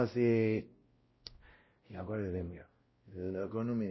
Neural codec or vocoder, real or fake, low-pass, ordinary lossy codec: codec, 16 kHz, 0.5 kbps, X-Codec, WavLM features, trained on Multilingual LibriSpeech; fake; 7.2 kHz; MP3, 24 kbps